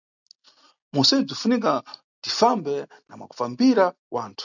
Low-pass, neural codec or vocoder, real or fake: 7.2 kHz; none; real